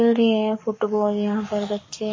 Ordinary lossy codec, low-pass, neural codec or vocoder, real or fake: MP3, 32 kbps; 7.2 kHz; codec, 44.1 kHz, 7.8 kbps, Pupu-Codec; fake